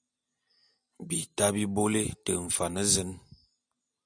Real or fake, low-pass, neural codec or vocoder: real; 9.9 kHz; none